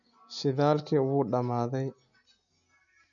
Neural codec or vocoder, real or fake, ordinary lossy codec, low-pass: none; real; none; 7.2 kHz